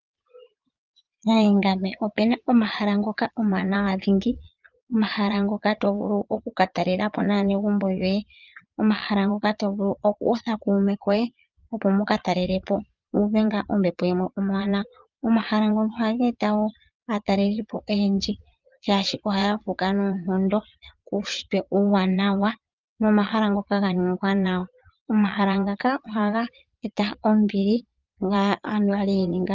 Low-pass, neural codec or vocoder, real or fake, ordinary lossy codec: 7.2 kHz; vocoder, 22.05 kHz, 80 mel bands, Vocos; fake; Opus, 24 kbps